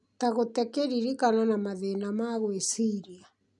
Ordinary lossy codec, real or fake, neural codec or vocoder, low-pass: none; real; none; 10.8 kHz